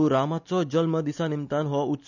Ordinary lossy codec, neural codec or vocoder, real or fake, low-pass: none; none; real; 7.2 kHz